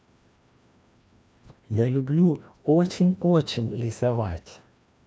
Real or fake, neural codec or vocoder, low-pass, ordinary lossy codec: fake; codec, 16 kHz, 1 kbps, FreqCodec, larger model; none; none